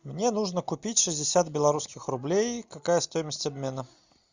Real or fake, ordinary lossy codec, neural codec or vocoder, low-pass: real; Opus, 64 kbps; none; 7.2 kHz